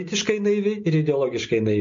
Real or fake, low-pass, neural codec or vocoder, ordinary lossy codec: real; 7.2 kHz; none; MP3, 48 kbps